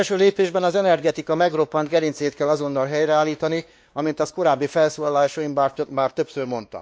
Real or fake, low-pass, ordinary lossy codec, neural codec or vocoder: fake; none; none; codec, 16 kHz, 2 kbps, X-Codec, WavLM features, trained on Multilingual LibriSpeech